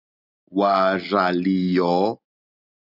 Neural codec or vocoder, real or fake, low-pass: none; real; 5.4 kHz